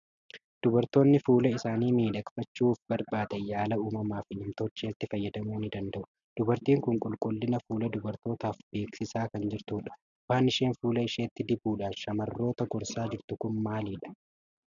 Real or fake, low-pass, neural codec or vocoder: real; 7.2 kHz; none